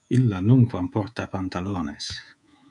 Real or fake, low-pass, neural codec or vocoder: fake; 10.8 kHz; codec, 24 kHz, 3.1 kbps, DualCodec